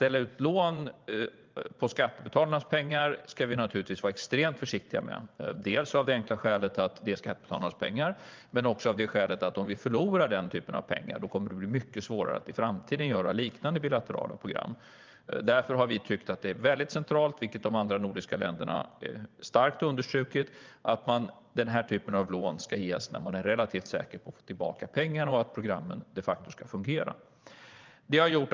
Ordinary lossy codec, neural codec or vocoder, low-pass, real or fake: Opus, 24 kbps; vocoder, 22.05 kHz, 80 mel bands, Vocos; 7.2 kHz; fake